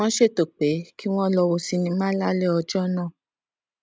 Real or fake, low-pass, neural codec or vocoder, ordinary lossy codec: real; none; none; none